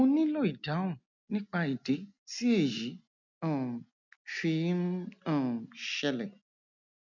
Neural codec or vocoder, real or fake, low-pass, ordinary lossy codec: none; real; 7.2 kHz; none